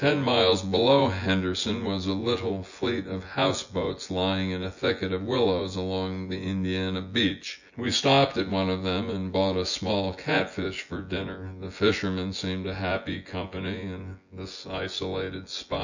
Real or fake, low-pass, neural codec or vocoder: fake; 7.2 kHz; vocoder, 24 kHz, 100 mel bands, Vocos